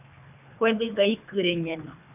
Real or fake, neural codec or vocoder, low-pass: fake; codec, 24 kHz, 3 kbps, HILCodec; 3.6 kHz